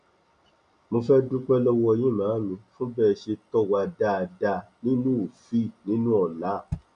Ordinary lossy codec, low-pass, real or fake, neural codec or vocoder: none; 9.9 kHz; real; none